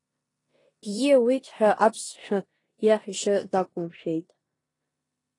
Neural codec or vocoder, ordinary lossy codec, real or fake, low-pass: codec, 16 kHz in and 24 kHz out, 0.9 kbps, LongCat-Audio-Codec, four codebook decoder; AAC, 32 kbps; fake; 10.8 kHz